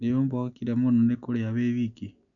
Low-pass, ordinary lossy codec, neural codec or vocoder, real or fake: 7.2 kHz; none; none; real